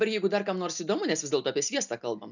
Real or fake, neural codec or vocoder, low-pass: real; none; 7.2 kHz